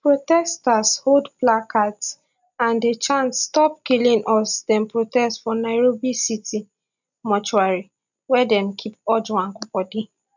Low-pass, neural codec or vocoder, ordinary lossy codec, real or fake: 7.2 kHz; none; none; real